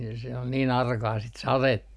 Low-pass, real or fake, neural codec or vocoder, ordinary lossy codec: none; real; none; none